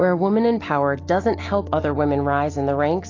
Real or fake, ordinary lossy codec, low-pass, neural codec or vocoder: real; AAC, 32 kbps; 7.2 kHz; none